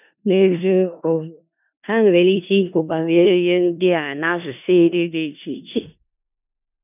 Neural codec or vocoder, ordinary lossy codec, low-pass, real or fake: codec, 16 kHz in and 24 kHz out, 0.4 kbps, LongCat-Audio-Codec, four codebook decoder; none; 3.6 kHz; fake